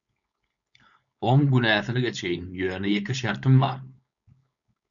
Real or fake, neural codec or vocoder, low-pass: fake; codec, 16 kHz, 4.8 kbps, FACodec; 7.2 kHz